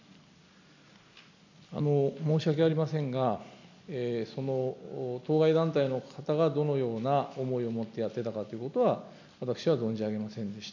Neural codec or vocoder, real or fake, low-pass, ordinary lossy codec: none; real; 7.2 kHz; none